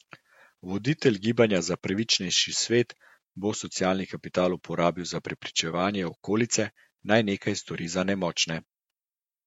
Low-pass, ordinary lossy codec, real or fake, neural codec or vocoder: 19.8 kHz; MP3, 64 kbps; fake; vocoder, 48 kHz, 128 mel bands, Vocos